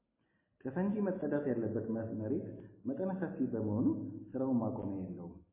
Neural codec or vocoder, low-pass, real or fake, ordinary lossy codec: none; 3.6 kHz; real; MP3, 16 kbps